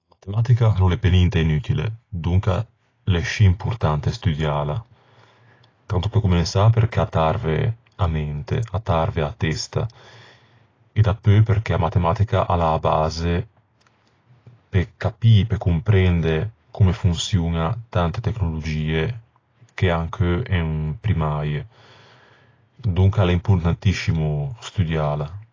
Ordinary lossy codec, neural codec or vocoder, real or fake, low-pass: AAC, 32 kbps; none; real; 7.2 kHz